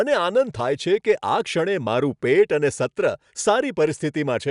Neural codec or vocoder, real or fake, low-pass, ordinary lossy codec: none; real; 10.8 kHz; none